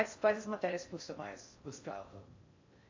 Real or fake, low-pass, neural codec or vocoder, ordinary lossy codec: fake; 7.2 kHz; codec, 16 kHz in and 24 kHz out, 0.6 kbps, FocalCodec, streaming, 4096 codes; AAC, 48 kbps